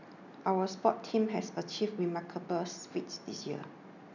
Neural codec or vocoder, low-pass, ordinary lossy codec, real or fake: none; 7.2 kHz; none; real